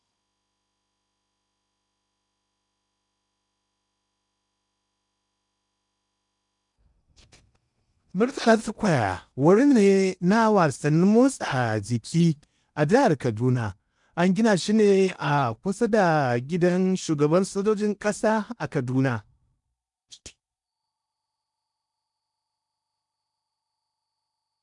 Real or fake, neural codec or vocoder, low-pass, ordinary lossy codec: fake; codec, 16 kHz in and 24 kHz out, 0.8 kbps, FocalCodec, streaming, 65536 codes; 10.8 kHz; none